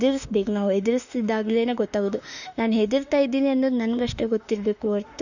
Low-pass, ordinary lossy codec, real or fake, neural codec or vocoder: 7.2 kHz; none; fake; autoencoder, 48 kHz, 32 numbers a frame, DAC-VAE, trained on Japanese speech